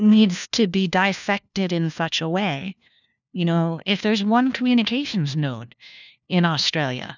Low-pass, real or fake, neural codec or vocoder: 7.2 kHz; fake; codec, 16 kHz, 1 kbps, FunCodec, trained on LibriTTS, 50 frames a second